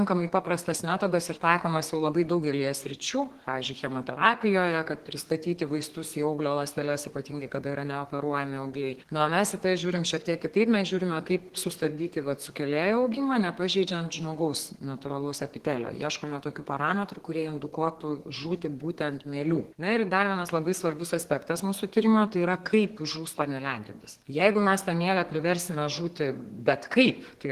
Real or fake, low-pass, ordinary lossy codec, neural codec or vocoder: fake; 14.4 kHz; Opus, 16 kbps; codec, 32 kHz, 1.9 kbps, SNAC